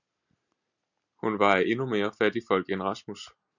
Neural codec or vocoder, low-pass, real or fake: none; 7.2 kHz; real